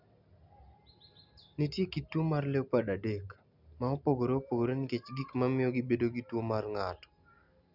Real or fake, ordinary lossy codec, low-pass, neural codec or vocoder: real; none; 5.4 kHz; none